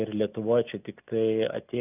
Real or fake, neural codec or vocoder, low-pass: real; none; 3.6 kHz